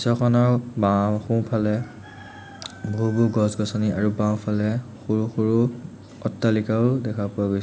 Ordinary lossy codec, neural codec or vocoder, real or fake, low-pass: none; none; real; none